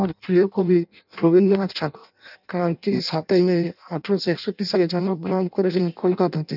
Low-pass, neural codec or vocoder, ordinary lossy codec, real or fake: 5.4 kHz; codec, 16 kHz in and 24 kHz out, 0.6 kbps, FireRedTTS-2 codec; none; fake